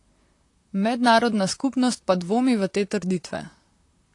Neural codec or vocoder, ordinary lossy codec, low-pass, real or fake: vocoder, 44.1 kHz, 128 mel bands, Pupu-Vocoder; AAC, 48 kbps; 10.8 kHz; fake